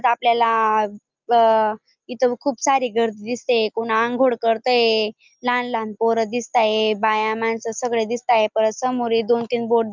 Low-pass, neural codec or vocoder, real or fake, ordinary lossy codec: 7.2 kHz; none; real; Opus, 24 kbps